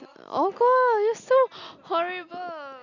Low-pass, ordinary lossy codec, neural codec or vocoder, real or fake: 7.2 kHz; none; none; real